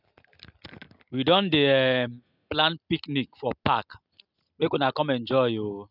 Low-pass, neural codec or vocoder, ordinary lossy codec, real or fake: 5.4 kHz; none; none; real